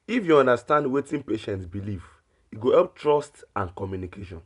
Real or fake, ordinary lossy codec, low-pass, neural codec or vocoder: real; none; 10.8 kHz; none